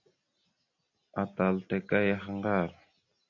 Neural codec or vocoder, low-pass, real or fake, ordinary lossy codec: none; 7.2 kHz; real; AAC, 48 kbps